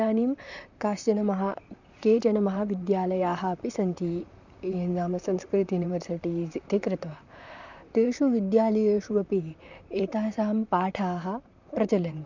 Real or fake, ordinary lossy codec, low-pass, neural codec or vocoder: fake; none; 7.2 kHz; vocoder, 44.1 kHz, 128 mel bands, Pupu-Vocoder